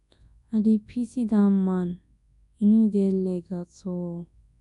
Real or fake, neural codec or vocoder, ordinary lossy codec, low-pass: fake; codec, 24 kHz, 0.9 kbps, WavTokenizer, large speech release; none; 10.8 kHz